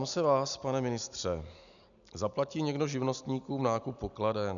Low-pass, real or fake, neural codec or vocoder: 7.2 kHz; real; none